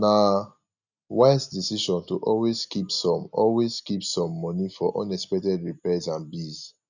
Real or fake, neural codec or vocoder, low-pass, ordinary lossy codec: real; none; 7.2 kHz; none